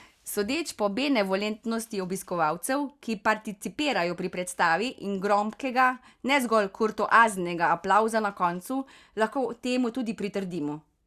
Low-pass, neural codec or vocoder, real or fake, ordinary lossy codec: 14.4 kHz; none; real; Opus, 64 kbps